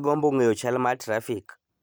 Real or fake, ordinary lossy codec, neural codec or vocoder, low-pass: real; none; none; none